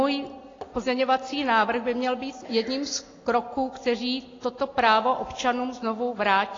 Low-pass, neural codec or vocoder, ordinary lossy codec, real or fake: 7.2 kHz; none; AAC, 32 kbps; real